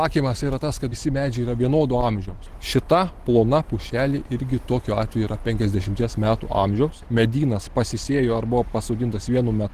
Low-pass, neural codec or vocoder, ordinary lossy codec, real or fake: 14.4 kHz; none; Opus, 16 kbps; real